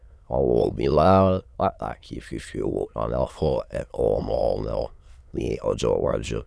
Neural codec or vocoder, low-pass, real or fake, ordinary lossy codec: autoencoder, 22.05 kHz, a latent of 192 numbers a frame, VITS, trained on many speakers; none; fake; none